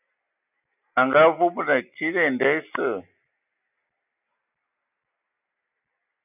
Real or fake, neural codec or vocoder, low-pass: real; none; 3.6 kHz